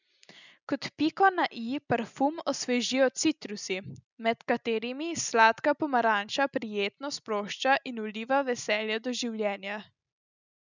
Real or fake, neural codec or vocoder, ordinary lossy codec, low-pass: real; none; none; 7.2 kHz